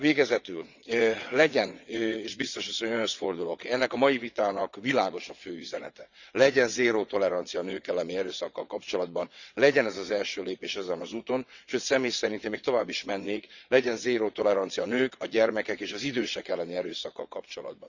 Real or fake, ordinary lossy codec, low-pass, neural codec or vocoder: fake; none; 7.2 kHz; vocoder, 22.05 kHz, 80 mel bands, WaveNeXt